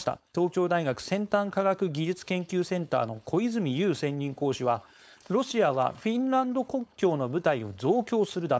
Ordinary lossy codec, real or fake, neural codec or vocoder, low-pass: none; fake; codec, 16 kHz, 4.8 kbps, FACodec; none